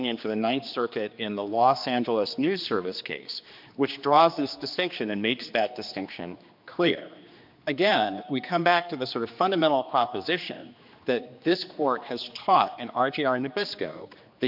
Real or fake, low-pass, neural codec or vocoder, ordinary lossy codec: fake; 5.4 kHz; codec, 16 kHz, 2 kbps, X-Codec, HuBERT features, trained on general audio; MP3, 48 kbps